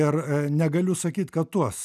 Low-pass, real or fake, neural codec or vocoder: 14.4 kHz; real; none